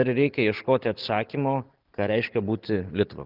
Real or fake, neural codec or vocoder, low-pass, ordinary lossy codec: real; none; 5.4 kHz; Opus, 24 kbps